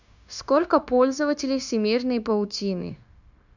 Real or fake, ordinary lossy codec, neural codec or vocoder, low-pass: fake; none; codec, 16 kHz, 0.9 kbps, LongCat-Audio-Codec; 7.2 kHz